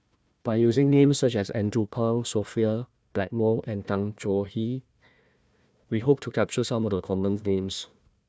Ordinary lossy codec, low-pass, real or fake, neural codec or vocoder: none; none; fake; codec, 16 kHz, 1 kbps, FunCodec, trained on Chinese and English, 50 frames a second